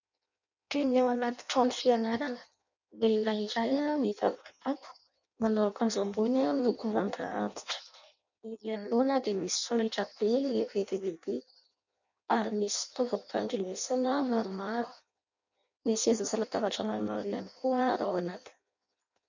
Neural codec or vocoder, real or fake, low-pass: codec, 16 kHz in and 24 kHz out, 0.6 kbps, FireRedTTS-2 codec; fake; 7.2 kHz